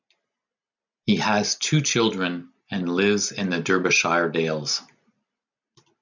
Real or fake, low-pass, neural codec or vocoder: real; 7.2 kHz; none